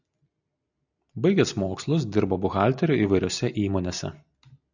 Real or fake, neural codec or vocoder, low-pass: real; none; 7.2 kHz